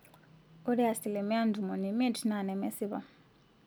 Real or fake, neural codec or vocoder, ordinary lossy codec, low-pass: real; none; none; none